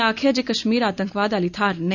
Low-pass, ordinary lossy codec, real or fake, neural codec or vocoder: 7.2 kHz; none; real; none